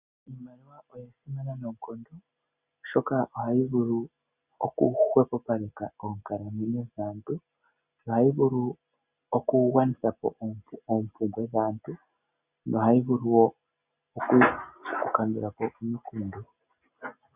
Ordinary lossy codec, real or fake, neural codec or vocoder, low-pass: Opus, 64 kbps; real; none; 3.6 kHz